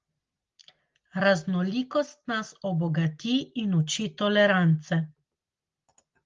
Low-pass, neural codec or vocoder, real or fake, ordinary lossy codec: 7.2 kHz; none; real; Opus, 32 kbps